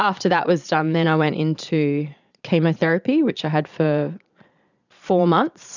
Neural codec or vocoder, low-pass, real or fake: vocoder, 22.05 kHz, 80 mel bands, Vocos; 7.2 kHz; fake